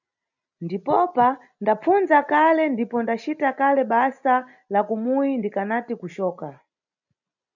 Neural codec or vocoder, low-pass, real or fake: none; 7.2 kHz; real